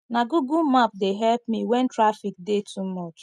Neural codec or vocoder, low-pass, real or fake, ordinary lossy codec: none; none; real; none